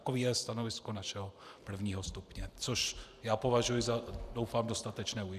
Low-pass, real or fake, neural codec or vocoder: 14.4 kHz; fake; vocoder, 44.1 kHz, 128 mel bands every 512 samples, BigVGAN v2